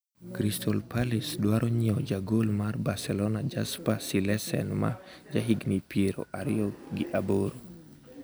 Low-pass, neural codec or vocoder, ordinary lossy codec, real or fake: none; none; none; real